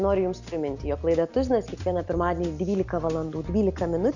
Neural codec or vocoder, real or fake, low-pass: none; real; 7.2 kHz